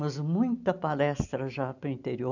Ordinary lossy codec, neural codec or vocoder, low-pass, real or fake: none; none; 7.2 kHz; real